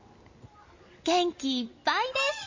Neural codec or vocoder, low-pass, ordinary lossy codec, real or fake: none; 7.2 kHz; MP3, 32 kbps; real